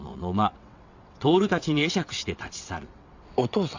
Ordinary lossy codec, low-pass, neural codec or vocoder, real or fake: AAC, 48 kbps; 7.2 kHz; vocoder, 22.05 kHz, 80 mel bands, WaveNeXt; fake